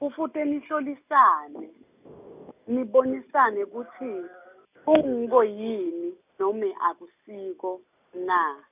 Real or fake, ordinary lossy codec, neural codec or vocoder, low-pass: real; none; none; 3.6 kHz